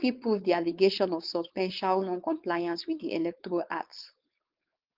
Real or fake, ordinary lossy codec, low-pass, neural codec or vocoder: fake; Opus, 32 kbps; 5.4 kHz; codec, 16 kHz, 4.8 kbps, FACodec